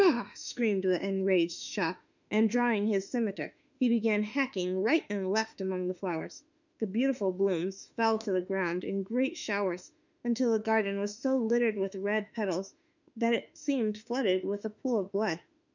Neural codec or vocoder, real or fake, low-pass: autoencoder, 48 kHz, 32 numbers a frame, DAC-VAE, trained on Japanese speech; fake; 7.2 kHz